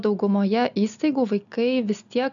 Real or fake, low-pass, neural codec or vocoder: real; 7.2 kHz; none